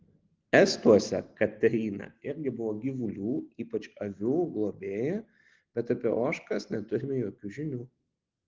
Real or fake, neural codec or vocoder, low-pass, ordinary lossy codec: real; none; 7.2 kHz; Opus, 16 kbps